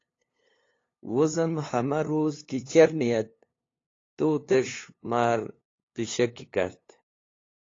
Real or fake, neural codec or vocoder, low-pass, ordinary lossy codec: fake; codec, 16 kHz, 2 kbps, FunCodec, trained on LibriTTS, 25 frames a second; 7.2 kHz; AAC, 32 kbps